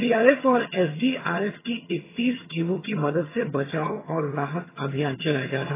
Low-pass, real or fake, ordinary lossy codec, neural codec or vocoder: 3.6 kHz; fake; AAC, 16 kbps; vocoder, 22.05 kHz, 80 mel bands, HiFi-GAN